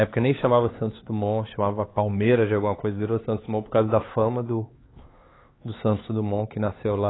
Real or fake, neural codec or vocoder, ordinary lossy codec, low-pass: fake; codec, 16 kHz, 4 kbps, X-Codec, HuBERT features, trained on LibriSpeech; AAC, 16 kbps; 7.2 kHz